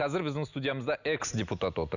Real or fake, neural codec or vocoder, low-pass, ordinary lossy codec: real; none; 7.2 kHz; none